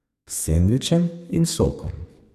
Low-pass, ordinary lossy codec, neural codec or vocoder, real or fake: 14.4 kHz; none; codec, 32 kHz, 1.9 kbps, SNAC; fake